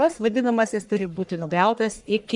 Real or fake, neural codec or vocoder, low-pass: fake; codec, 44.1 kHz, 1.7 kbps, Pupu-Codec; 10.8 kHz